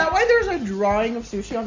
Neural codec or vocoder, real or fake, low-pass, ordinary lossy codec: none; real; 7.2 kHz; MP3, 64 kbps